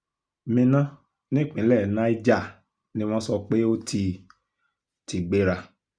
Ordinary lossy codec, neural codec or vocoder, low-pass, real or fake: none; none; 9.9 kHz; real